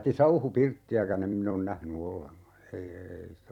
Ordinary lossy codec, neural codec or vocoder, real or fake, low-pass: none; vocoder, 44.1 kHz, 128 mel bands every 256 samples, BigVGAN v2; fake; 19.8 kHz